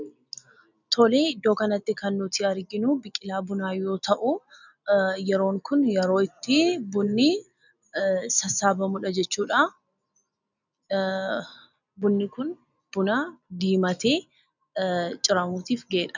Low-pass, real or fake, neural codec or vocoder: 7.2 kHz; real; none